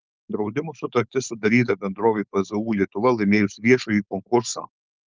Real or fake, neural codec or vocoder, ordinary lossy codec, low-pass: fake; codec, 16 kHz, 4.8 kbps, FACodec; Opus, 32 kbps; 7.2 kHz